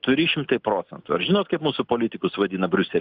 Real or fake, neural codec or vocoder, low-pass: real; none; 5.4 kHz